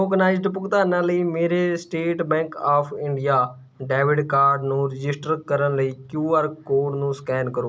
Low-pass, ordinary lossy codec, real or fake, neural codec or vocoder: none; none; real; none